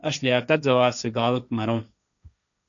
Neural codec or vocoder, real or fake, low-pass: codec, 16 kHz, 1.1 kbps, Voila-Tokenizer; fake; 7.2 kHz